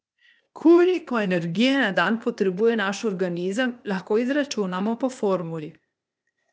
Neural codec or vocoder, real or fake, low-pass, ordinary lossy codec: codec, 16 kHz, 0.8 kbps, ZipCodec; fake; none; none